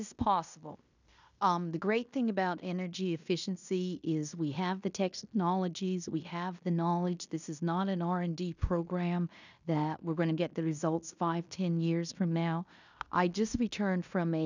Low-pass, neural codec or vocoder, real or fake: 7.2 kHz; codec, 16 kHz in and 24 kHz out, 0.9 kbps, LongCat-Audio-Codec, fine tuned four codebook decoder; fake